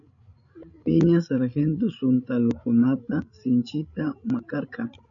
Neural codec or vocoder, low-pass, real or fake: codec, 16 kHz, 16 kbps, FreqCodec, larger model; 7.2 kHz; fake